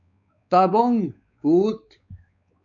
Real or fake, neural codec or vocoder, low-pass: fake; codec, 16 kHz, 4 kbps, X-Codec, WavLM features, trained on Multilingual LibriSpeech; 7.2 kHz